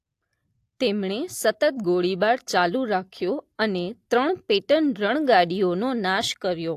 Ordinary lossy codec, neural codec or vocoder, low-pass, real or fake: AAC, 64 kbps; none; 14.4 kHz; real